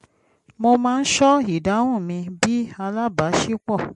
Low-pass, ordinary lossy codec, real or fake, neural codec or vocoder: 14.4 kHz; MP3, 48 kbps; real; none